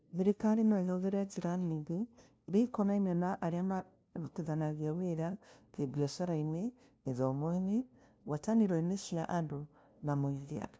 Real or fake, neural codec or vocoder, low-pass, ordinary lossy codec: fake; codec, 16 kHz, 0.5 kbps, FunCodec, trained on LibriTTS, 25 frames a second; none; none